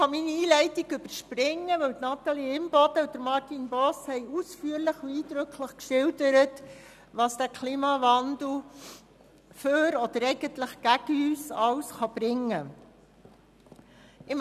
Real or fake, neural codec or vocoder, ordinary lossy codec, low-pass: real; none; none; 14.4 kHz